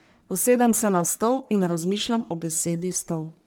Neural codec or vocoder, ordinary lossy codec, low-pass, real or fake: codec, 44.1 kHz, 1.7 kbps, Pupu-Codec; none; none; fake